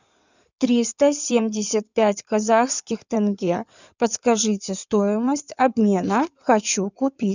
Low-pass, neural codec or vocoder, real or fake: 7.2 kHz; codec, 16 kHz in and 24 kHz out, 2.2 kbps, FireRedTTS-2 codec; fake